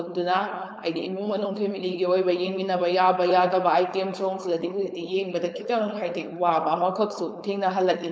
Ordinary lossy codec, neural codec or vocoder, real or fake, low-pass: none; codec, 16 kHz, 4.8 kbps, FACodec; fake; none